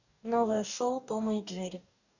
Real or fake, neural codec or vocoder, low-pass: fake; codec, 44.1 kHz, 2.6 kbps, DAC; 7.2 kHz